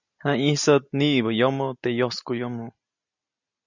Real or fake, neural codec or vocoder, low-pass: real; none; 7.2 kHz